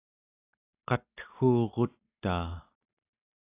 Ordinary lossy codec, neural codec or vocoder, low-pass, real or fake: AAC, 32 kbps; none; 3.6 kHz; real